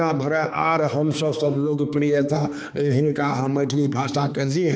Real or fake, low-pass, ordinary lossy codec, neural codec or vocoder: fake; none; none; codec, 16 kHz, 2 kbps, X-Codec, HuBERT features, trained on balanced general audio